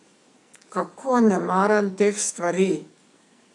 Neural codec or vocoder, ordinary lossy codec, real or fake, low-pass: codec, 44.1 kHz, 2.6 kbps, SNAC; none; fake; 10.8 kHz